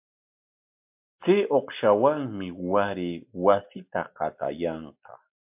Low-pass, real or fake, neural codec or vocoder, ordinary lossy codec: 3.6 kHz; real; none; MP3, 32 kbps